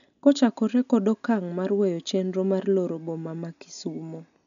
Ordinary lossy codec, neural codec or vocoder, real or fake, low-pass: none; none; real; 7.2 kHz